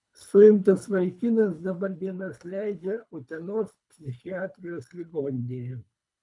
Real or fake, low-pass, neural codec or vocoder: fake; 10.8 kHz; codec, 24 kHz, 3 kbps, HILCodec